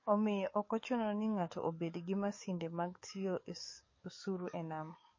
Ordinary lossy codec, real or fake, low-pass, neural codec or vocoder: MP3, 32 kbps; fake; 7.2 kHz; codec, 44.1 kHz, 7.8 kbps, DAC